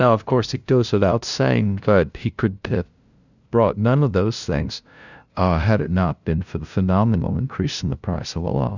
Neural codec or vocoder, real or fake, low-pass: codec, 16 kHz, 0.5 kbps, FunCodec, trained on LibriTTS, 25 frames a second; fake; 7.2 kHz